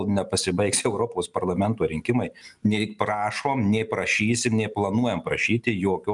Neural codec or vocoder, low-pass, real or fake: none; 10.8 kHz; real